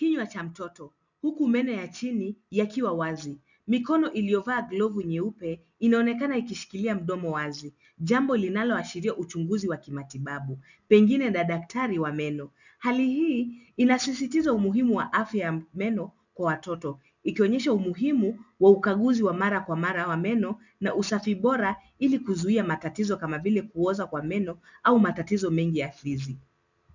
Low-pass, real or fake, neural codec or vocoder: 7.2 kHz; real; none